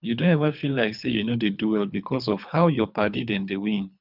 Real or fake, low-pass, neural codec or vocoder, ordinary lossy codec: fake; 5.4 kHz; codec, 24 kHz, 3 kbps, HILCodec; none